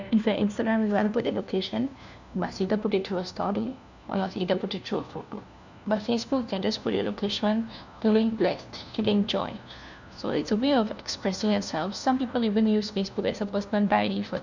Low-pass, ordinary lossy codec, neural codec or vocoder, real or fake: 7.2 kHz; none; codec, 16 kHz, 1 kbps, FunCodec, trained on LibriTTS, 50 frames a second; fake